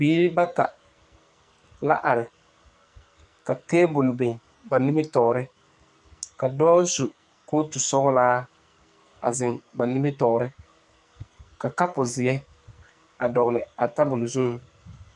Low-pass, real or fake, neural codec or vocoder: 10.8 kHz; fake; codec, 44.1 kHz, 2.6 kbps, SNAC